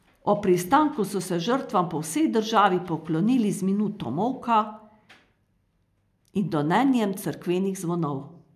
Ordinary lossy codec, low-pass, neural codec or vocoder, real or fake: MP3, 96 kbps; 14.4 kHz; none; real